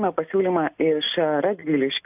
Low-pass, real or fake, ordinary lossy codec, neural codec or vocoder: 3.6 kHz; real; AAC, 32 kbps; none